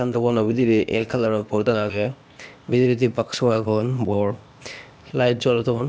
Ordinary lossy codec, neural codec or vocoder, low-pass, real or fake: none; codec, 16 kHz, 0.8 kbps, ZipCodec; none; fake